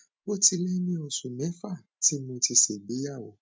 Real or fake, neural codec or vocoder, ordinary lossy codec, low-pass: real; none; none; none